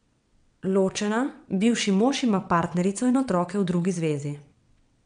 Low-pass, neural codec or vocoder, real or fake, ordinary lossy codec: 9.9 kHz; vocoder, 22.05 kHz, 80 mel bands, WaveNeXt; fake; MP3, 96 kbps